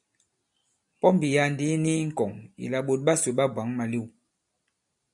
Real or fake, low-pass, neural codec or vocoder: real; 10.8 kHz; none